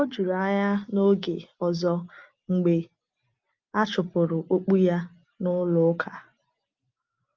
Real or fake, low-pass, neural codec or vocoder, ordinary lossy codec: real; 7.2 kHz; none; Opus, 24 kbps